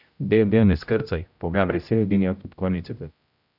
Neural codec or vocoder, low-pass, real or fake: codec, 16 kHz, 0.5 kbps, X-Codec, HuBERT features, trained on general audio; 5.4 kHz; fake